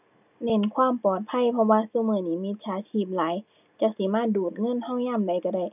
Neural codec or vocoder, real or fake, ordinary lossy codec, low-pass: none; real; none; 3.6 kHz